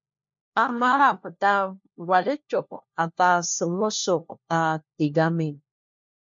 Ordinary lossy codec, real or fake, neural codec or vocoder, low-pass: MP3, 48 kbps; fake; codec, 16 kHz, 1 kbps, FunCodec, trained on LibriTTS, 50 frames a second; 7.2 kHz